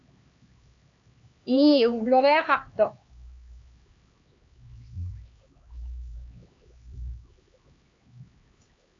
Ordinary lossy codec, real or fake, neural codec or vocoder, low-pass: AAC, 48 kbps; fake; codec, 16 kHz, 2 kbps, X-Codec, HuBERT features, trained on LibriSpeech; 7.2 kHz